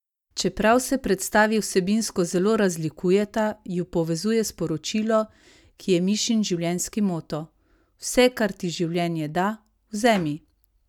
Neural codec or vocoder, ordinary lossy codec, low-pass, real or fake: none; none; 19.8 kHz; real